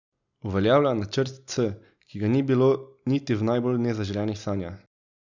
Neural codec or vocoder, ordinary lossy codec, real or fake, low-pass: none; none; real; 7.2 kHz